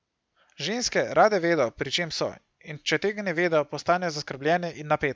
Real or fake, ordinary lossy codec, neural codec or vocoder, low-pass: real; none; none; none